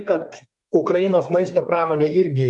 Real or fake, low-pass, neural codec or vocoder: fake; 10.8 kHz; codec, 24 kHz, 1 kbps, SNAC